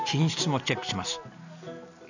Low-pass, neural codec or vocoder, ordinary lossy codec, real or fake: 7.2 kHz; none; none; real